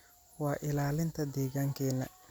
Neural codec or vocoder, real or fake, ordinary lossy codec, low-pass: none; real; none; none